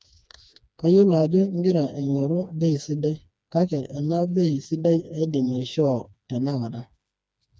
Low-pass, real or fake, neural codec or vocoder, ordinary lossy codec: none; fake; codec, 16 kHz, 2 kbps, FreqCodec, smaller model; none